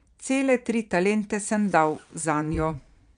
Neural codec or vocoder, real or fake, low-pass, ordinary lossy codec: vocoder, 22.05 kHz, 80 mel bands, Vocos; fake; 9.9 kHz; none